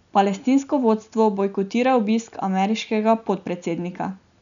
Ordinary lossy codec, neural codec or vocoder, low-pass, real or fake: none; none; 7.2 kHz; real